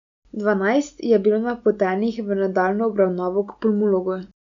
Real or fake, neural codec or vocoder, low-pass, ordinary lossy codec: real; none; 7.2 kHz; none